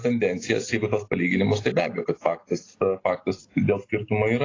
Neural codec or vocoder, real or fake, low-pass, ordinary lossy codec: none; real; 7.2 kHz; AAC, 32 kbps